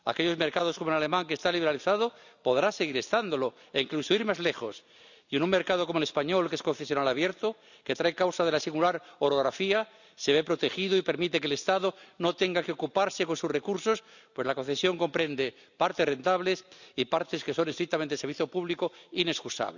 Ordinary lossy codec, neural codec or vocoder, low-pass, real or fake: none; none; 7.2 kHz; real